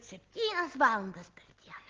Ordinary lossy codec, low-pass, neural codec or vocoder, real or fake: Opus, 16 kbps; 7.2 kHz; none; real